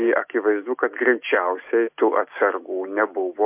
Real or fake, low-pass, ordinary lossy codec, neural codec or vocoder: real; 3.6 kHz; MP3, 32 kbps; none